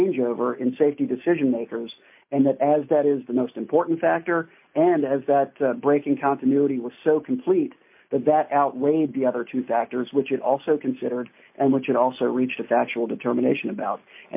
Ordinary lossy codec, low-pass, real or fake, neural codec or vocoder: MP3, 32 kbps; 3.6 kHz; real; none